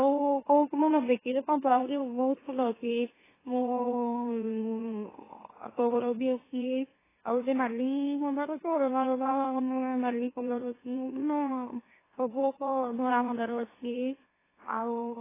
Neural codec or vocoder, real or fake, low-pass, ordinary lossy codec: autoencoder, 44.1 kHz, a latent of 192 numbers a frame, MeloTTS; fake; 3.6 kHz; AAC, 16 kbps